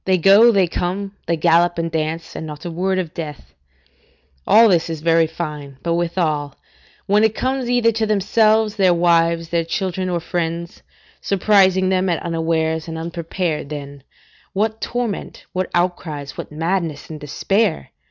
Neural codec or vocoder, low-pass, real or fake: none; 7.2 kHz; real